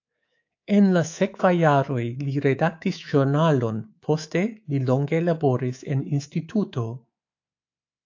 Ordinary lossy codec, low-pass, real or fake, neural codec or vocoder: AAC, 48 kbps; 7.2 kHz; fake; codec, 24 kHz, 3.1 kbps, DualCodec